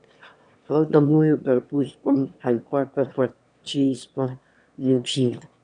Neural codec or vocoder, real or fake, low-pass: autoencoder, 22.05 kHz, a latent of 192 numbers a frame, VITS, trained on one speaker; fake; 9.9 kHz